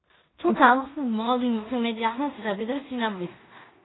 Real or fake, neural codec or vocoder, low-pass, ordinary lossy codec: fake; codec, 16 kHz in and 24 kHz out, 0.4 kbps, LongCat-Audio-Codec, two codebook decoder; 7.2 kHz; AAC, 16 kbps